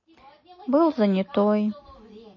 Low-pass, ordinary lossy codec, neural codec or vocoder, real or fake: 7.2 kHz; MP3, 32 kbps; none; real